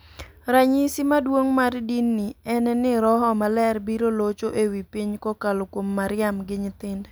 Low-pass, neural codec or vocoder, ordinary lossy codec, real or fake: none; none; none; real